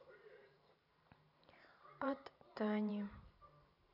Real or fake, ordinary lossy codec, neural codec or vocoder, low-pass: fake; none; vocoder, 44.1 kHz, 128 mel bands every 512 samples, BigVGAN v2; 5.4 kHz